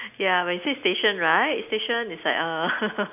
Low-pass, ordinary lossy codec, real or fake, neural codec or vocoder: 3.6 kHz; none; real; none